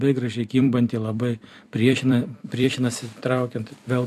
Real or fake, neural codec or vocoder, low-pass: fake; vocoder, 44.1 kHz, 128 mel bands every 256 samples, BigVGAN v2; 14.4 kHz